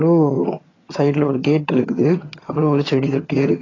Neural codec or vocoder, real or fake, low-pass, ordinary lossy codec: vocoder, 22.05 kHz, 80 mel bands, HiFi-GAN; fake; 7.2 kHz; AAC, 32 kbps